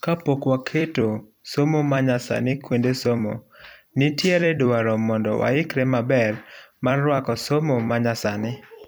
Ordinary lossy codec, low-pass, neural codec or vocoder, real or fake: none; none; vocoder, 44.1 kHz, 128 mel bands every 256 samples, BigVGAN v2; fake